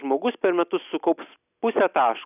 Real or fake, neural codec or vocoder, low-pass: real; none; 3.6 kHz